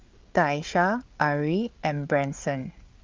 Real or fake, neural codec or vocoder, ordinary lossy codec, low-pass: fake; codec, 16 kHz, 8 kbps, FreqCodec, larger model; Opus, 24 kbps; 7.2 kHz